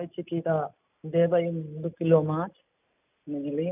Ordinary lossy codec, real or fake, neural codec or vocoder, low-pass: none; real; none; 3.6 kHz